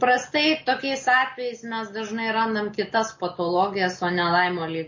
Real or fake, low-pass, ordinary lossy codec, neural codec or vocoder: real; 7.2 kHz; MP3, 32 kbps; none